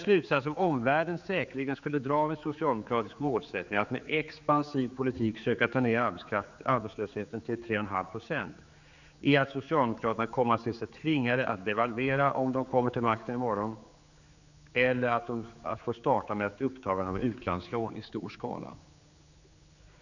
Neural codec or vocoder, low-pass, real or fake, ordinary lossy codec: codec, 16 kHz, 4 kbps, X-Codec, HuBERT features, trained on general audio; 7.2 kHz; fake; none